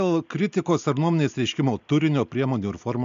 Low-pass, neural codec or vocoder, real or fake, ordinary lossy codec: 7.2 kHz; none; real; MP3, 64 kbps